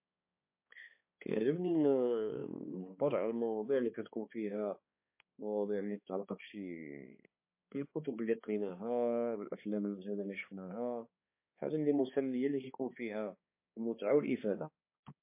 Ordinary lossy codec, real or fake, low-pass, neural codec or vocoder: MP3, 24 kbps; fake; 3.6 kHz; codec, 16 kHz, 2 kbps, X-Codec, HuBERT features, trained on balanced general audio